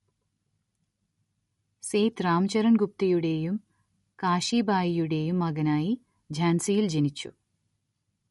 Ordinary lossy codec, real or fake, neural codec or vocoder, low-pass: MP3, 48 kbps; real; none; 19.8 kHz